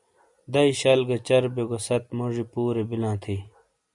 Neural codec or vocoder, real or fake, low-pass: none; real; 10.8 kHz